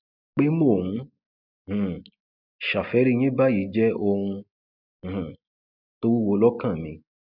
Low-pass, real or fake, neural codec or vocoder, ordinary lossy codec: 5.4 kHz; real; none; none